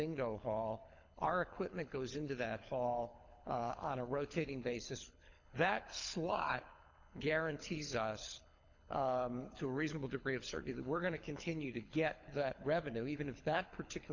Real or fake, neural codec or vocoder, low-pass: fake; codec, 24 kHz, 6 kbps, HILCodec; 7.2 kHz